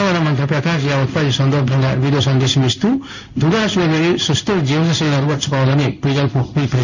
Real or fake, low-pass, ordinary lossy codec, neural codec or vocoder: fake; 7.2 kHz; none; codec, 16 kHz in and 24 kHz out, 1 kbps, XY-Tokenizer